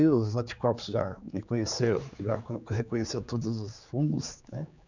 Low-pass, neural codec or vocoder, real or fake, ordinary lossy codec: 7.2 kHz; codec, 16 kHz, 4 kbps, X-Codec, HuBERT features, trained on general audio; fake; none